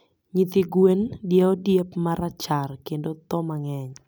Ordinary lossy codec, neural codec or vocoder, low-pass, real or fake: none; none; none; real